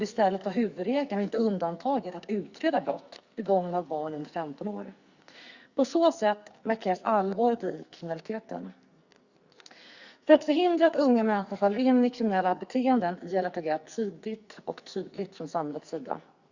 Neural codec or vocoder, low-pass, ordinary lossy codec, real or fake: codec, 44.1 kHz, 2.6 kbps, SNAC; 7.2 kHz; Opus, 64 kbps; fake